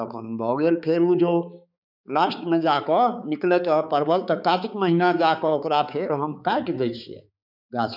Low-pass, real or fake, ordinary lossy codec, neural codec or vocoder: 5.4 kHz; fake; none; codec, 16 kHz, 4 kbps, X-Codec, HuBERT features, trained on balanced general audio